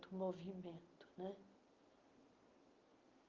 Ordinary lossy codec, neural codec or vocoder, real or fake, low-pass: Opus, 32 kbps; none; real; 7.2 kHz